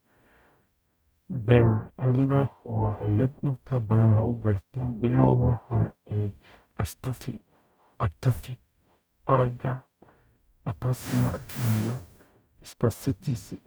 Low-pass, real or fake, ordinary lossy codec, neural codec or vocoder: none; fake; none; codec, 44.1 kHz, 0.9 kbps, DAC